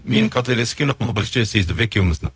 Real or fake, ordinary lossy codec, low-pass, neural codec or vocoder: fake; none; none; codec, 16 kHz, 0.4 kbps, LongCat-Audio-Codec